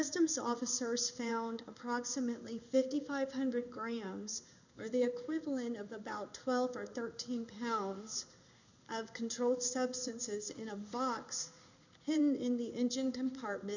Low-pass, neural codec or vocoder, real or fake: 7.2 kHz; codec, 16 kHz in and 24 kHz out, 1 kbps, XY-Tokenizer; fake